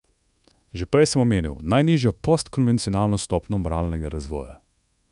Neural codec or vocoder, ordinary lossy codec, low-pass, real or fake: codec, 24 kHz, 1.2 kbps, DualCodec; none; 10.8 kHz; fake